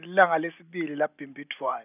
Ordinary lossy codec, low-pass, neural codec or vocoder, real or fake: none; 3.6 kHz; none; real